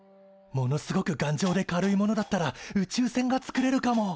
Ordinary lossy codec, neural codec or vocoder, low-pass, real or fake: none; none; none; real